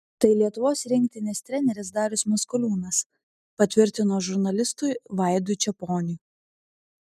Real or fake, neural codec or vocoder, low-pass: real; none; 14.4 kHz